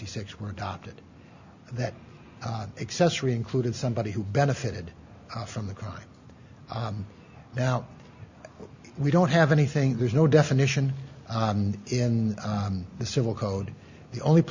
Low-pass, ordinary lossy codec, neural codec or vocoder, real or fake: 7.2 kHz; Opus, 64 kbps; none; real